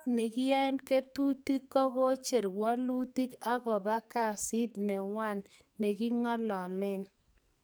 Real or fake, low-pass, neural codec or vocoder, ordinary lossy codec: fake; none; codec, 44.1 kHz, 2.6 kbps, SNAC; none